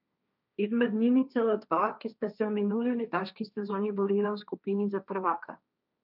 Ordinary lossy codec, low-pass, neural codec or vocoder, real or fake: none; 5.4 kHz; codec, 16 kHz, 1.1 kbps, Voila-Tokenizer; fake